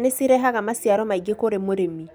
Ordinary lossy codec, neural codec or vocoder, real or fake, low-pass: none; none; real; none